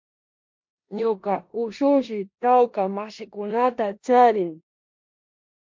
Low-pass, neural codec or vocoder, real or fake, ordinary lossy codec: 7.2 kHz; codec, 16 kHz in and 24 kHz out, 0.9 kbps, LongCat-Audio-Codec, four codebook decoder; fake; MP3, 48 kbps